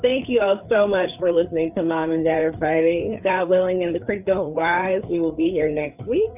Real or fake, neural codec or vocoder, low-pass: fake; codec, 16 kHz, 4 kbps, FreqCodec, larger model; 3.6 kHz